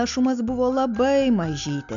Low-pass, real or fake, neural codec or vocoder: 7.2 kHz; real; none